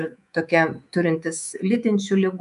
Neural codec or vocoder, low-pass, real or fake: codec, 24 kHz, 3.1 kbps, DualCodec; 10.8 kHz; fake